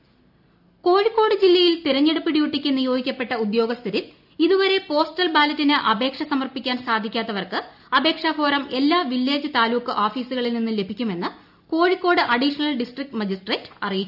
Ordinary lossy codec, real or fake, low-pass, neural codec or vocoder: none; real; 5.4 kHz; none